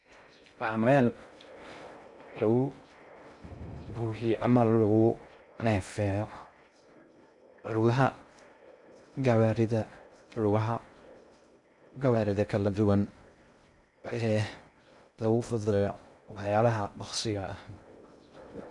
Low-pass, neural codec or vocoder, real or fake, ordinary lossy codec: 10.8 kHz; codec, 16 kHz in and 24 kHz out, 0.6 kbps, FocalCodec, streaming, 2048 codes; fake; none